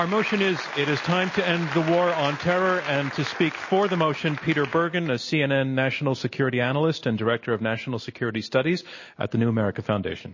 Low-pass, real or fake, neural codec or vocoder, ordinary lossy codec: 7.2 kHz; real; none; MP3, 32 kbps